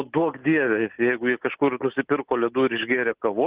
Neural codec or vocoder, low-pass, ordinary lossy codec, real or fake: none; 3.6 kHz; Opus, 16 kbps; real